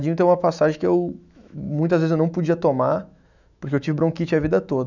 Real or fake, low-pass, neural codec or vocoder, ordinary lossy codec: fake; 7.2 kHz; autoencoder, 48 kHz, 128 numbers a frame, DAC-VAE, trained on Japanese speech; none